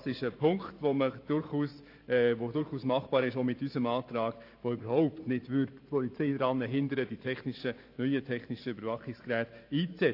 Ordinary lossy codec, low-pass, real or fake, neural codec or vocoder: AAC, 32 kbps; 5.4 kHz; fake; vocoder, 44.1 kHz, 128 mel bands every 512 samples, BigVGAN v2